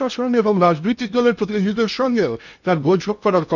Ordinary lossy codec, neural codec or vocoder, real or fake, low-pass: none; codec, 16 kHz in and 24 kHz out, 0.8 kbps, FocalCodec, streaming, 65536 codes; fake; 7.2 kHz